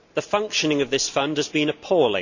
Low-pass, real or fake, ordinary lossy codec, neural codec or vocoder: 7.2 kHz; real; none; none